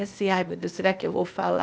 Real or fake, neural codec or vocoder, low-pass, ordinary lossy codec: fake; codec, 16 kHz, 0.8 kbps, ZipCodec; none; none